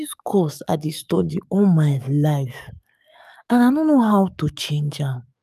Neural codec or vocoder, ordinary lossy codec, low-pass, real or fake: codec, 44.1 kHz, 7.8 kbps, DAC; none; 14.4 kHz; fake